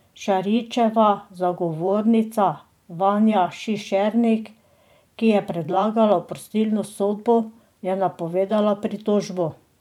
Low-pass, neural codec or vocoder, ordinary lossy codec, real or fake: 19.8 kHz; vocoder, 44.1 kHz, 128 mel bands every 512 samples, BigVGAN v2; none; fake